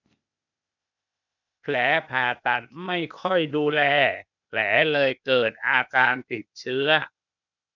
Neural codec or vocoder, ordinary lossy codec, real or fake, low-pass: codec, 16 kHz, 0.8 kbps, ZipCodec; none; fake; 7.2 kHz